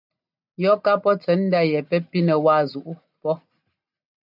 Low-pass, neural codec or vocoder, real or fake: 5.4 kHz; none; real